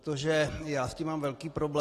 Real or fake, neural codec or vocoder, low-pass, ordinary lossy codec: real; none; 14.4 kHz; AAC, 48 kbps